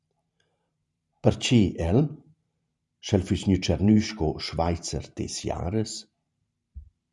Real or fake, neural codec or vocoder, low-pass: fake; vocoder, 44.1 kHz, 128 mel bands every 512 samples, BigVGAN v2; 10.8 kHz